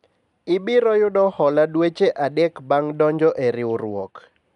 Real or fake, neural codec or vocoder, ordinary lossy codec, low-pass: real; none; none; 10.8 kHz